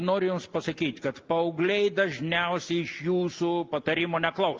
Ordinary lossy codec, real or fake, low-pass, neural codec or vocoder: Opus, 32 kbps; real; 7.2 kHz; none